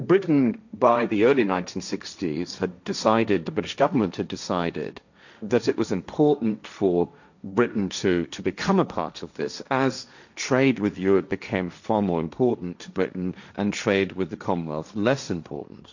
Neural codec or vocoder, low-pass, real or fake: codec, 16 kHz, 1.1 kbps, Voila-Tokenizer; 7.2 kHz; fake